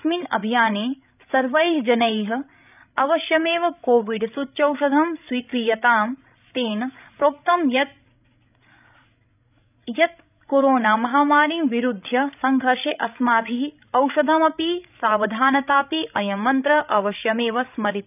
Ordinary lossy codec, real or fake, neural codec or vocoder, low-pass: none; fake; codec, 16 kHz, 16 kbps, FreqCodec, larger model; 3.6 kHz